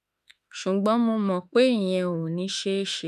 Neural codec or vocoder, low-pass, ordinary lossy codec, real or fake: autoencoder, 48 kHz, 32 numbers a frame, DAC-VAE, trained on Japanese speech; 14.4 kHz; none; fake